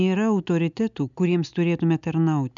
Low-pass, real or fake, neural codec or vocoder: 7.2 kHz; real; none